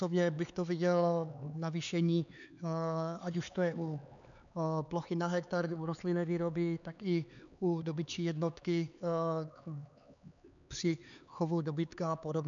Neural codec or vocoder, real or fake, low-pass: codec, 16 kHz, 4 kbps, X-Codec, HuBERT features, trained on LibriSpeech; fake; 7.2 kHz